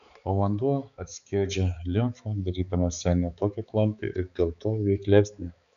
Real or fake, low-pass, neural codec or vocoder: fake; 7.2 kHz; codec, 16 kHz, 4 kbps, X-Codec, HuBERT features, trained on balanced general audio